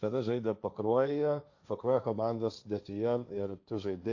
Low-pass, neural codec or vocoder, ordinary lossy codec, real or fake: 7.2 kHz; codec, 16 kHz, 1.1 kbps, Voila-Tokenizer; AAC, 48 kbps; fake